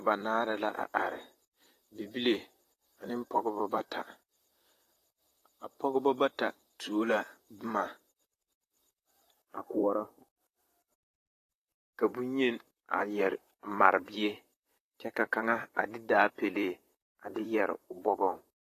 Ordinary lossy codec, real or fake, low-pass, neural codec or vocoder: AAC, 48 kbps; fake; 14.4 kHz; vocoder, 44.1 kHz, 128 mel bands, Pupu-Vocoder